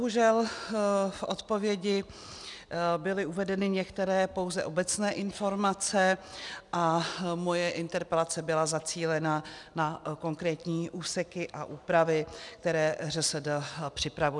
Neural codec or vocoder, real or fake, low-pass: none; real; 10.8 kHz